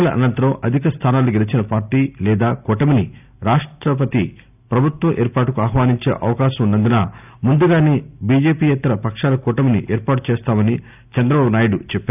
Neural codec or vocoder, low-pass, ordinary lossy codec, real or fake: none; 3.6 kHz; none; real